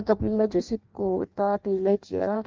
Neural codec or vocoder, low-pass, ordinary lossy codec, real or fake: codec, 16 kHz in and 24 kHz out, 0.6 kbps, FireRedTTS-2 codec; 7.2 kHz; Opus, 24 kbps; fake